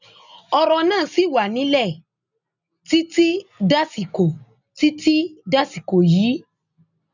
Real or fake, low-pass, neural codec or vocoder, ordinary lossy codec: real; 7.2 kHz; none; none